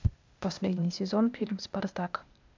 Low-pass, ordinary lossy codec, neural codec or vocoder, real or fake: 7.2 kHz; MP3, 64 kbps; codec, 16 kHz, 0.8 kbps, ZipCodec; fake